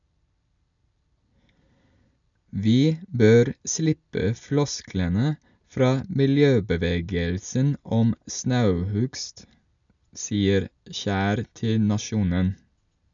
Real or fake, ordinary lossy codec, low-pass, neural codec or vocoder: real; none; 7.2 kHz; none